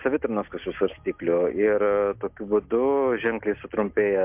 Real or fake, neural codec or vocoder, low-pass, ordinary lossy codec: real; none; 3.6 kHz; MP3, 32 kbps